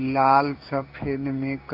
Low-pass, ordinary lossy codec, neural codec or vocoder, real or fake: 5.4 kHz; AAC, 48 kbps; codec, 16 kHz in and 24 kHz out, 1 kbps, XY-Tokenizer; fake